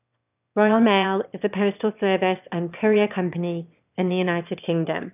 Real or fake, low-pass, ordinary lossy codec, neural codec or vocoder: fake; 3.6 kHz; none; autoencoder, 22.05 kHz, a latent of 192 numbers a frame, VITS, trained on one speaker